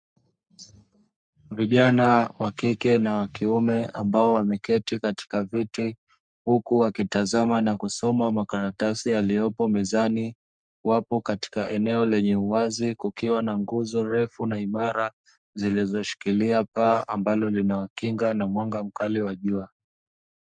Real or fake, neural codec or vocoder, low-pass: fake; codec, 44.1 kHz, 3.4 kbps, Pupu-Codec; 9.9 kHz